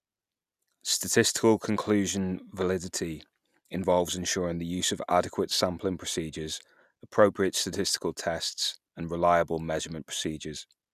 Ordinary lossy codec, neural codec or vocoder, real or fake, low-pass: none; none; real; 14.4 kHz